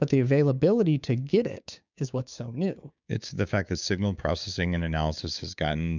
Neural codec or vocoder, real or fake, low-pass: codec, 16 kHz, 6 kbps, DAC; fake; 7.2 kHz